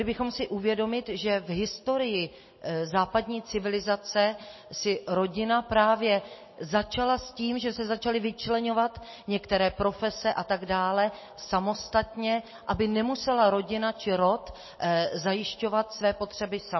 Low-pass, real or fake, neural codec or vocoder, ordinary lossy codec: 7.2 kHz; real; none; MP3, 24 kbps